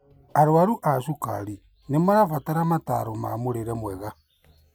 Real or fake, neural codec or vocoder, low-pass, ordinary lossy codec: real; none; none; none